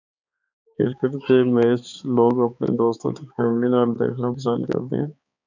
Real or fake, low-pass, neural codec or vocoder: fake; 7.2 kHz; codec, 16 kHz, 4 kbps, X-Codec, HuBERT features, trained on balanced general audio